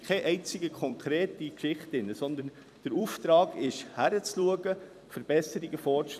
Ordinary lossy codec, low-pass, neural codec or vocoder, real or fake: none; 14.4 kHz; vocoder, 44.1 kHz, 128 mel bands every 256 samples, BigVGAN v2; fake